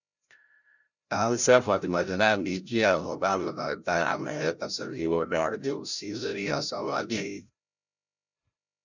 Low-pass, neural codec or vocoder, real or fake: 7.2 kHz; codec, 16 kHz, 0.5 kbps, FreqCodec, larger model; fake